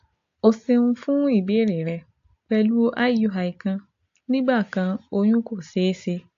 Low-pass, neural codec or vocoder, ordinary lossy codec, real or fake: 7.2 kHz; none; AAC, 48 kbps; real